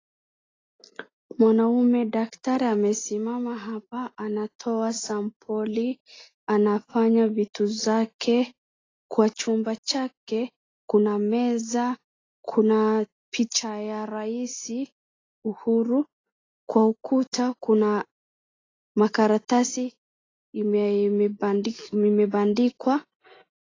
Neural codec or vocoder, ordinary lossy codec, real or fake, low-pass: none; AAC, 32 kbps; real; 7.2 kHz